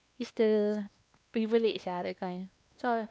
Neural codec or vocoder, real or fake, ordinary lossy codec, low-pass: codec, 16 kHz, 2 kbps, X-Codec, WavLM features, trained on Multilingual LibriSpeech; fake; none; none